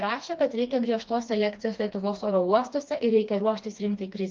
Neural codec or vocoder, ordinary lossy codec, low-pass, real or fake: codec, 16 kHz, 2 kbps, FreqCodec, smaller model; Opus, 32 kbps; 7.2 kHz; fake